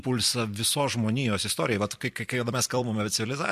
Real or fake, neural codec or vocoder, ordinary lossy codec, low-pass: fake; vocoder, 44.1 kHz, 128 mel bands every 256 samples, BigVGAN v2; MP3, 64 kbps; 14.4 kHz